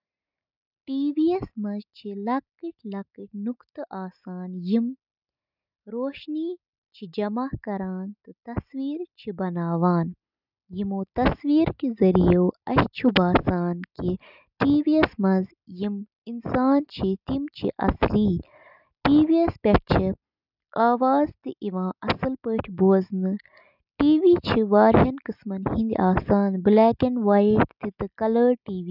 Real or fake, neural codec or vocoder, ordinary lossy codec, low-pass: real; none; none; 5.4 kHz